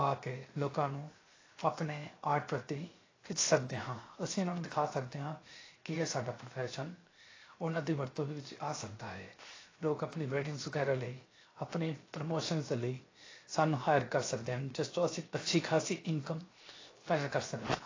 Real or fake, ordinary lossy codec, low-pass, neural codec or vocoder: fake; AAC, 32 kbps; 7.2 kHz; codec, 16 kHz, 0.7 kbps, FocalCodec